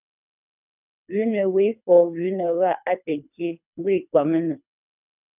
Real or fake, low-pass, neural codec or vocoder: fake; 3.6 kHz; codec, 24 kHz, 3 kbps, HILCodec